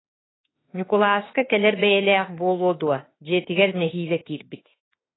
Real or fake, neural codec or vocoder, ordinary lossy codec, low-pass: fake; autoencoder, 48 kHz, 32 numbers a frame, DAC-VAE, trained on Japanese speech; AAC, 16 kbps; 7.2 kHz